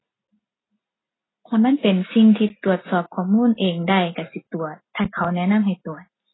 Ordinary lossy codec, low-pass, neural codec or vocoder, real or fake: AAC, 16 kbps; 7.2 kHz; none; real